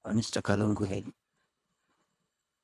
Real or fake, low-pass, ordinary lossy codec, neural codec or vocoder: fake; none; none; codec, 24 kHz, 1.5 kbps, HILCodec